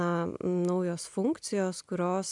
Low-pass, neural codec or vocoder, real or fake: 10.8 kHz; none; real